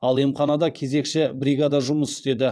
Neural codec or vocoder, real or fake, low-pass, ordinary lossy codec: vocoder, 22.05 kHz, 80 mel bands, WaveNeXt; fake; none; none